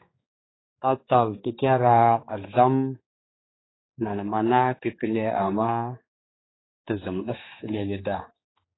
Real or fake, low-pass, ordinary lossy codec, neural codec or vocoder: fake; 7.2 kHz; AAC, 16 kbps; codec, 16 kHz, 4 kbps, X-Codec, HuBERT features, trained on general audio